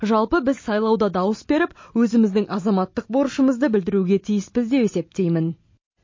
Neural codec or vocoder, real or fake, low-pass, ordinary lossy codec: none; real; 7.2 kHz; MP3, 32 kbps